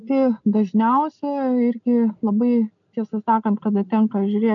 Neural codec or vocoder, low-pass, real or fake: none; 7.2 kHz; real